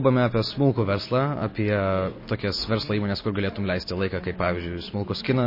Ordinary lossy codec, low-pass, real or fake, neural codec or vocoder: MP3, 24 kbps; 5.4 kHz; real; none